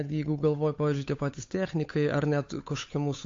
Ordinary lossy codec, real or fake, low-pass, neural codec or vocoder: AAC, 48 kbps; fake; 7.2 kHz; codec, 16 kHz, 8 kbps, FunCodec, trained on Chinese and English, 25 frames a second